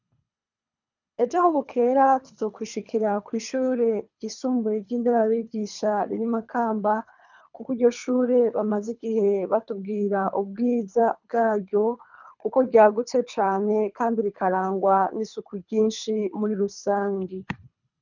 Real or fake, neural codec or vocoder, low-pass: fake; codec, 24 kHz, 3 kbps, HILCodec; 7.2 kHz